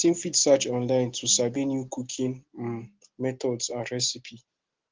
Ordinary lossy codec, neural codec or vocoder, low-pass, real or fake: Opus, 16 kbps; none; 7.2 kHz; real